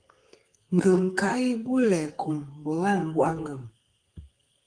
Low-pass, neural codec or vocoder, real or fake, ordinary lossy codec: 9.9 kHz; codec, 32 kHz, 1.9 kbps, SNAC; fake; Opus, 24 kbps